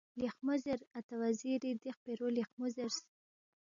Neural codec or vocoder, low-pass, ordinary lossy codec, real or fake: none; 7.2 kHz; MP3, 96 kbps; real